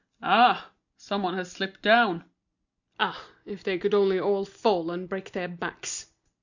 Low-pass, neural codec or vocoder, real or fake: 7.2 kHz; none; real